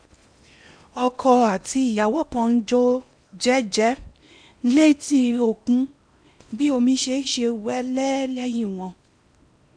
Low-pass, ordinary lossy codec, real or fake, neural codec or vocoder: 9.9 kHz; none; fake; codec, 16 kHz in and 24 kHz out, 0.8 kbps, FocalCodec, streaming, 65536 codes